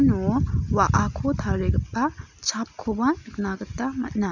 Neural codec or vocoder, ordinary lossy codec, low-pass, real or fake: none; none; 7.2 kHz; real